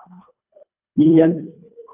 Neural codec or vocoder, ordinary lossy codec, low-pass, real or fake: codec, 24 kHz, 1.5 kbps, HILCodec; AAC, 32 kbps; 3.6 kHz; fake